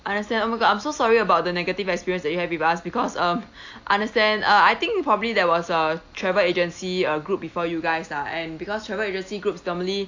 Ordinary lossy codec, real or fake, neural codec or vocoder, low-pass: AAC, 48 kbps; real; none; 7.2 kHz